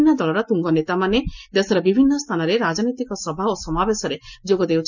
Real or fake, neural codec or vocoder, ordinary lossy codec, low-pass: real; none; none; 7.2 kHz